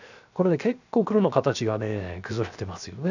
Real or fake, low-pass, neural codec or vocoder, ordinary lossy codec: fake; 7.2 kHz; codec, 16 kHz, 0.7 kbps, FocalCodec; none